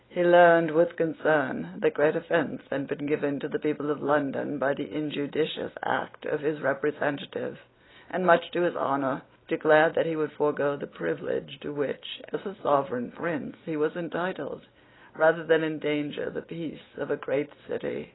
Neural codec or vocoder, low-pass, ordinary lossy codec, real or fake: none; 7.2 kHz; AAC, 16 kbps; real